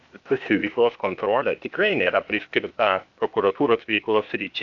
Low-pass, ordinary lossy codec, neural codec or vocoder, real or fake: 7.2 kHz; MP3, 96 kbps; codec, 16 kHz, 0.8 kbps, ZipCodec; fake